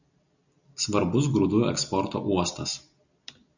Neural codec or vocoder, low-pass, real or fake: none; 7.2 kHz; real